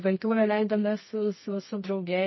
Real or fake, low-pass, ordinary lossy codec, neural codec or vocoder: fake; 7.2 kHz; MP3, 24 kbps; codec, 24 kHz, 0.9 kbps, WavTokenizer, medium music audio release